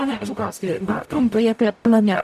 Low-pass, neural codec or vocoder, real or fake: 14.4 kHz; codec, 44.1 kHz, 0.9 kbps, DAC; fake